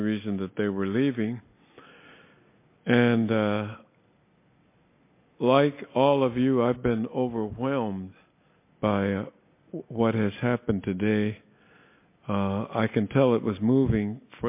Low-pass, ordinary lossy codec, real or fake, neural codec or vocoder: 3.6 kHz; MP3, 24 kbps; real; none